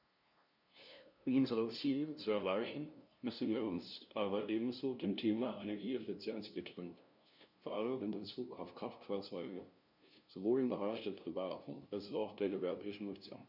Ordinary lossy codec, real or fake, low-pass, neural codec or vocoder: none; fake; 5.4 kHz; codec, 16 kHz, 0.5 kbps, FunCodec, trained on LibriTTS, 25 frames a second